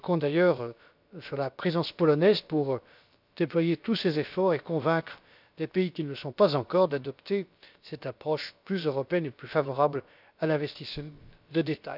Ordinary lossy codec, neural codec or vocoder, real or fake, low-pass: none; codec, 16 kHz, about 1 kbps, DyCAST, with the encoder's durations; fake; 5.4 kHz